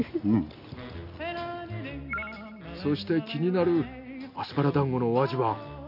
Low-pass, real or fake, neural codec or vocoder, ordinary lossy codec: 5.4 kHz; real; none; none